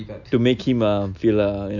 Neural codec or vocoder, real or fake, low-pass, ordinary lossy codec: none; real; 7.2 kHz; none